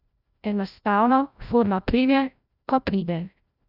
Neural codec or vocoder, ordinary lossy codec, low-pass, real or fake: codec, 16 kHz, 0.5 kbps, FreqCodec, larger model; none; 5.4 kHz; fake